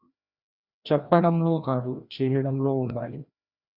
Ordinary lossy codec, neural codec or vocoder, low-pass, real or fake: Opus, 64 kbps; codec, 16 kHz, 1 kbps, FreqCodec, larger model; 5.4 kHz; fake